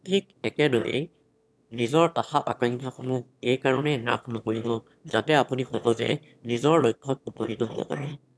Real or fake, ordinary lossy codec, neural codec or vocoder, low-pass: fake; none; autoencoder, 22.05 kHz, a latent of 192 numbers a frame, VITS, trained on one speaker; none